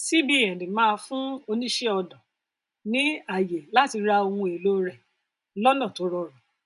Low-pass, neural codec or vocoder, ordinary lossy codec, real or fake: 10.8 kHz; none; none; real